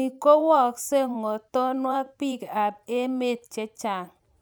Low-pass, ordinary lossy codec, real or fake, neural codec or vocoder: none; none; fake; vocoder, 44.1 kHz, 128 mel bands every 512 samples, BigVGAN v2